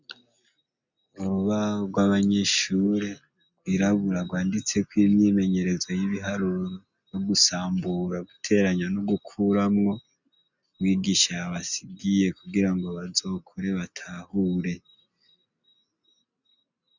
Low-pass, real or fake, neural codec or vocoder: 7.2 kHz; real; none